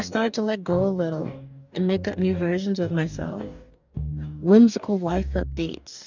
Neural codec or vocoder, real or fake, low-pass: codec, 44.1 kHz, 2.6 kbps, DAC; fake; 7.2 kHz